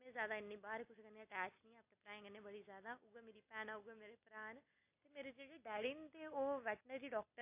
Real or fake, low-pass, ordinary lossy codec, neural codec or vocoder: real; 3.6 kHz; AAC, 32 kbps; none